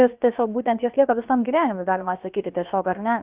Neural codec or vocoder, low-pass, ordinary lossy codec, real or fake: codec, 16 kHz, about 1 kbps, DyCAST, with the encoder's durations; 3.6 kHz; Opus, 32 kbps; fake